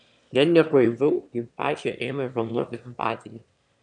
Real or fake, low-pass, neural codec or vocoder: fake; 9.9 kHz; autoencoder, 22.05 kHz, a latent of 192 numbers a frame, VITS, trained on one speaker